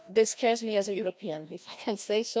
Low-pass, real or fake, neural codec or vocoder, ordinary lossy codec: none; fake; codec, 16 kHz, 1 kbps, FreqCodec, larger model; none